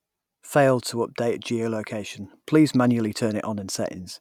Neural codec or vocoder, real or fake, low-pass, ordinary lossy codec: none; real; 19.8 kHz; none